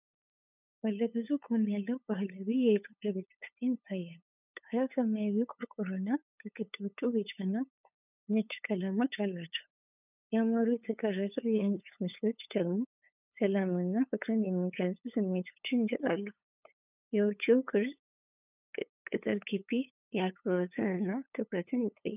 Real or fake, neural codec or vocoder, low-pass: fake; codec, 16 kHz, 8 kbps, FunCodec, trained on LibriTTS, 25 frames a second; 3.6 kHz